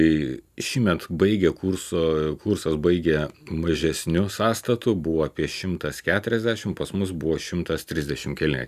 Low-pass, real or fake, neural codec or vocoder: 14.4 kHz; real; none